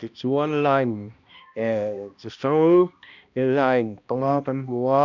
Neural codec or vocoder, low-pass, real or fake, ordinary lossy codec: codec, 16 kHz, 1 kbps, X-Codec, HuBERT features, trained on balanced general audio; 7.2 kHz; fake; none